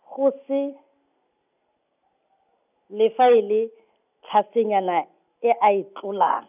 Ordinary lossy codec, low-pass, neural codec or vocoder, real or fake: none; 3.6 kHz; none; real